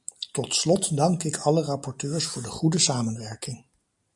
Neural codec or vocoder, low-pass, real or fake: none; 10.8 kHz; real